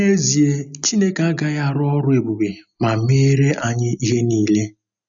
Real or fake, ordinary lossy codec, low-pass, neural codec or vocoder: real; MP3, 96 kbps; 7.2 kHz; none